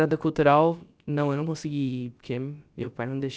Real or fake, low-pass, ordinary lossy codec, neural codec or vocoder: fake; none; none; codec, 16 kHz, about 1 kbps, DyCAST, with the encoder's durations